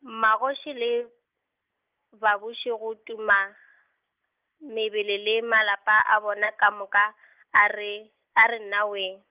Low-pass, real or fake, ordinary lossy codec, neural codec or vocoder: 3.6 kHz; real; Opus, 24 kbps; none